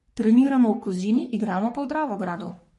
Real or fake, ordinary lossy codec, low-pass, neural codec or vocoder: fake; MP3, 48 kbps; 14.4 kHz; codec, 44.1 kHz, 3.4 kbps, Pupu-Codec